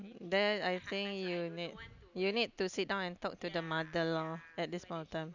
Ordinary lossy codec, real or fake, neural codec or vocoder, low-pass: none; real; none; 7.2 kHz